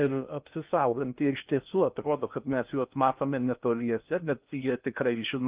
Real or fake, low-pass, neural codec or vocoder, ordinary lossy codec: fake; 3.6 kHz; codec, 16 kHz in and 24 kHz out, 0.6 kbps, FocalCodec, streaming, 2048 codes; Opus, 32 kbps